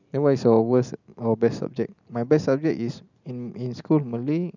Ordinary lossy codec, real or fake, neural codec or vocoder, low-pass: none; real; none; 7.2 kHz